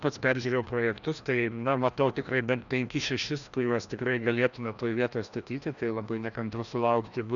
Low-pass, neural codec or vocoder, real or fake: 7.2 kHz; codec, 16 kHz, 1 kbps, FreqCodec, larger model; fake